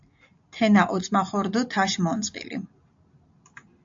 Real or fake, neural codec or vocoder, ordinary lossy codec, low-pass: real; none; AAC, 64 kbps; 7.2 kHz